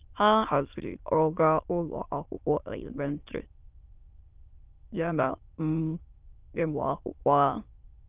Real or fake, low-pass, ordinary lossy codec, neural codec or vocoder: fake; 3.6 kHz; Opus, 24 kbps; autoencoder, 22.05 kHz, a latent of 192 numbers a frame, VITS, trained on many speakers